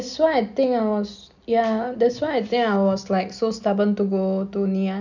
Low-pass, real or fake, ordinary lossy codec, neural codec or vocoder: 7.2 kHz; real; none; none